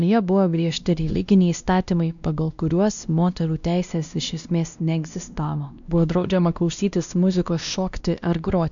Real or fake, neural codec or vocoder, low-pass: fake; codec, 16 kHz, 1 kbps, X-Codec, WavLM features, trained on Multilingual LibriSpeech; 7.2 kHz